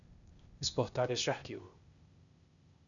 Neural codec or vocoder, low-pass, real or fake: codec, 16 kHz, 0.8 kbps, ZipCodec; 7.2 kHz; fake